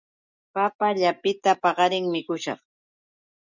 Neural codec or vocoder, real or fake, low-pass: none; real; 7.2 kHz